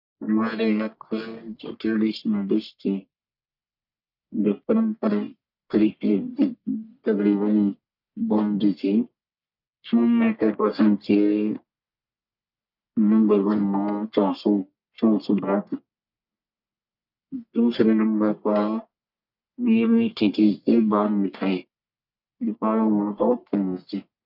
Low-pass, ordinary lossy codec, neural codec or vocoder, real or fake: 5.4 kHz; none; codec, 44.1 kHz, 1.7 kbps, Pupu-Codec; fake